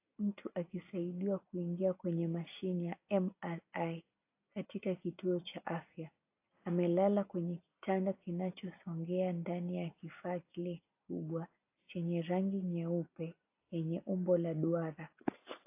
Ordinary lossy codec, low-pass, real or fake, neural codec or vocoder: AAC, 32 kbps; 3.6 kHz; real; none